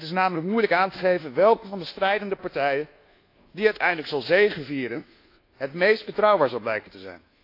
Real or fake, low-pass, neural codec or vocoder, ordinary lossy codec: fake; 5.4 kHz; codec, 24 kHz, 1.2 kbps, DualCodec; AAC, 32 kbps